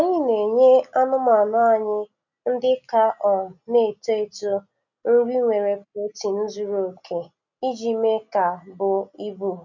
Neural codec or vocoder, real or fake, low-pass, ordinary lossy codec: none; real; 7.2 kHz; none